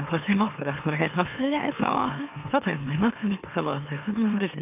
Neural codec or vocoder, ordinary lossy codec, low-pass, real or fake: autoencoder, 44.1 kHz, a latent of 192 numbers a frame, MeloTTS; none; 3.6 kHz; fake